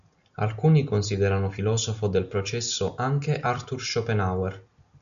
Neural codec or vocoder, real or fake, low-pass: none; real; 7.2 kHz